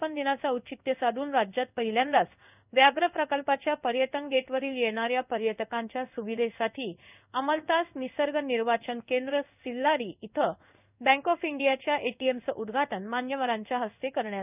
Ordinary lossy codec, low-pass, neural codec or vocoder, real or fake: none; 3.6 kHz; codec, 16 kHz in and 24 kHz out, 1 kbps, XY-Tokenizer; fake